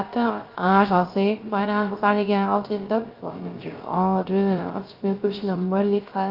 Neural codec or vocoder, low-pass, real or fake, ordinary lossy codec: codec, 16 kHz, 0.3 kbps, FocalCodec; 5.4 kHz; fake; Opus, 32 kbps